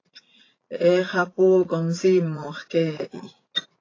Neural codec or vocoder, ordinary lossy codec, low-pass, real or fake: vocoder, 44.1 kHz, 80 mel bands, Vocos; AAC, 32 kbps; 7.2 kHz; fake